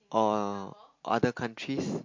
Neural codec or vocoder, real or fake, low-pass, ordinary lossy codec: none; real; 7.2 kHz; MP3, 48 kbps